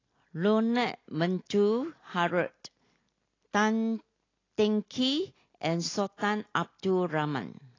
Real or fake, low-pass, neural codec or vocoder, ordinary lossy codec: real; 7.2 kHz; none; AAC, 32 kbps